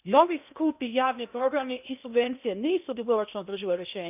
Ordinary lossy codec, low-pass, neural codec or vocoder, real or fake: none; 3.6 kHz; codec, 16 kHz in and 24 kHz out, 0.8 kbps, FocalCodec, streaming, 65536 codes; fake